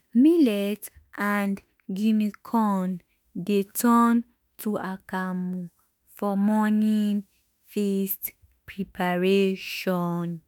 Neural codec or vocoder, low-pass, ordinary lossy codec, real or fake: autoencoder, 48 kHz, 32 numbers a frame, DAC-VAE, trained on Japanese speech; none; none; fake